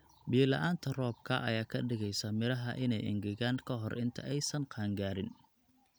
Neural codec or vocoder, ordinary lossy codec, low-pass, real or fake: none; none; none; real